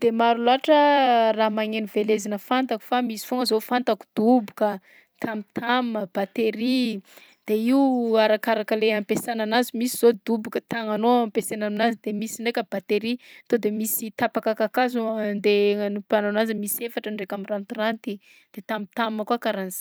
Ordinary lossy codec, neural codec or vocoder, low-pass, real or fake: none; vocoder, 44.1 kHz, 128 mel bands every 256 samples, BigVGAN v2; none; fake